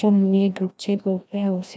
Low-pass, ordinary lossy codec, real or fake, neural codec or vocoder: none; none; fake; codec, 16 kHz, 1 kbps, FreqCodec, larger model